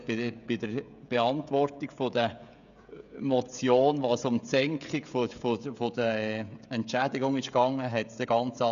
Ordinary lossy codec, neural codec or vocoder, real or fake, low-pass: none; codec, 16 kHz, 16 kbps, FreqCodec, smaller model; fake; 7.2 kHz